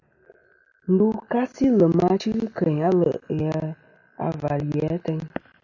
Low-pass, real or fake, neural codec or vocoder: 7.2 kHz; real; none